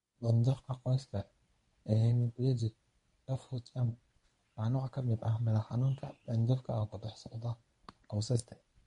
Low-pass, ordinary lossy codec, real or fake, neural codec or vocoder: 10.8 kHz; MP3, 48 kbps; fake; codec, 24 kHz, 0.9 kbps, WavTokenizer, medium speech release version 1